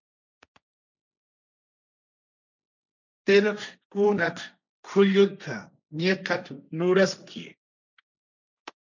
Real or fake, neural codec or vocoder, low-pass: fake; codec, 16 kHz, 1.1 kbps, Voila-Tokenizer; 7.2 kHz